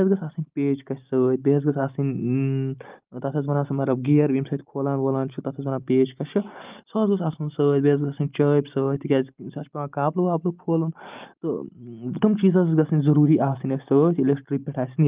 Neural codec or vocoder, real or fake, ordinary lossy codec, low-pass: none; real; Opus, 32 kbps; 3.6 kHz